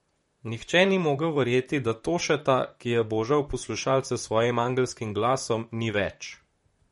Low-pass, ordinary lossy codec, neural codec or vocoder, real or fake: 19.8 kHz; MP3, 48 kbps; vocoder, 44.1 kHz, 128 mel bands, Pupu-Vocoder; fake